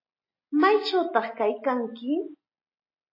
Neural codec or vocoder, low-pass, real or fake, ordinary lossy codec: none; 5.4 kHz; real; MP3, 24 kbps